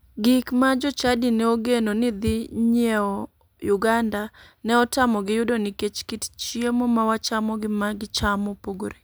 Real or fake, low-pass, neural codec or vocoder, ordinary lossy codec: real; none; none; none